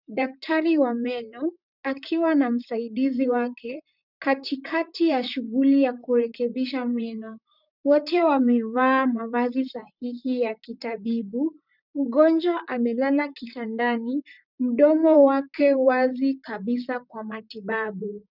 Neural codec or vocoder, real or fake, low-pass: vocoder, 44.1 kHz, 128 mel bands, Pupu-Vocoder; fake; 5.4 kHz